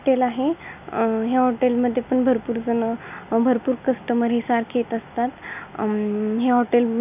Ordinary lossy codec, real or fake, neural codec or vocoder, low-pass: none; real; none; 3.6 kHz